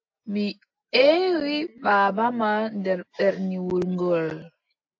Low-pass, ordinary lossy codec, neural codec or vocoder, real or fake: 7.2 kHz; AAC, 48 kbps; none; real